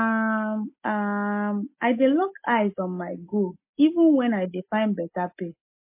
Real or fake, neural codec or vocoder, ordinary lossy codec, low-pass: real; none; MP3, 24 kbps; 3.6 kHz